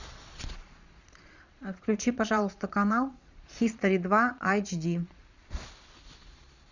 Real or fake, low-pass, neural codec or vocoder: fake; 7.2 kHz; vocoder, 22.05 kHz, 80 mel bands, Vocos